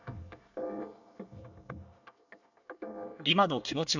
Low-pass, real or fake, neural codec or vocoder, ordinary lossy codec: 7.2 kHz; fake; codec, 24 kHz, 1 kbps, SNAC; none